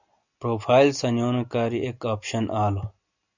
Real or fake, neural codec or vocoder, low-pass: real; none; 7.2 kHz